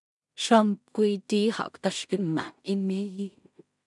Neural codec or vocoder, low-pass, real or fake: codec, 16 kHz in and 24 kHz out, 0.4 kbps, LongCat-Audio-Codec, two codebook decoder; 10.8 kHz; fake